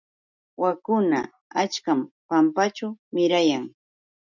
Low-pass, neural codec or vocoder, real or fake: 7.2 kHz; none; real